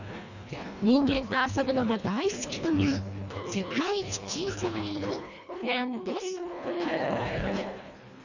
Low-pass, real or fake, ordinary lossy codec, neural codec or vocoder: 7.2 kHz; fake; none; codec, 24 kHz, 1.5 kbps, HILCodec